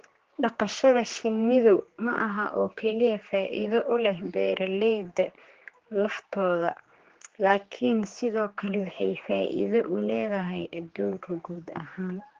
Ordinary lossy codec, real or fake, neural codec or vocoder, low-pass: Opus, 16 kbps; fake; codec, 16 kHz, 2 kbps, X-Codec, HuBERT features, trained on general audio; 7.2 kHz